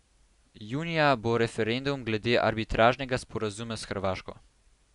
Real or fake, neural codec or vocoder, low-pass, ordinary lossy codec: real; none; 10.8 kHz; none